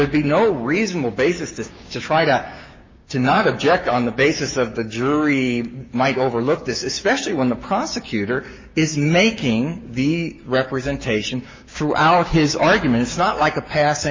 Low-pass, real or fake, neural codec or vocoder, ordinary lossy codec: 7.2 kHz; fake; codec, 16 kHz, 6 kbps, DAC; MP3, 32 kbps